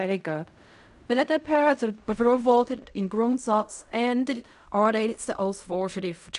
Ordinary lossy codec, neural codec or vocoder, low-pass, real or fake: none; codec, 16 kHz in and 24 kHz out, 0.4 kbps, LongCat-Audio-Codec, fine tuned four codebook decoder; 10.8 kHz; fake